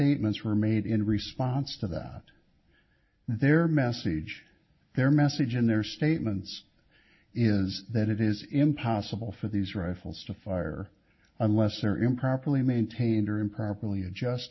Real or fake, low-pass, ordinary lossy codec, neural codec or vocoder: real; 7.2 kHz; MP3, 24 kbps; none